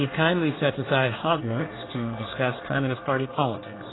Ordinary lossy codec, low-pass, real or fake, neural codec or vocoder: AAC, 16 kbps; 7.2 kHz; fake; codec, 24 kHz, 1 kbps, SNAC